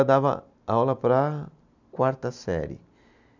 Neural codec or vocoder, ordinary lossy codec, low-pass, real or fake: none; none; 7.2 kHz; real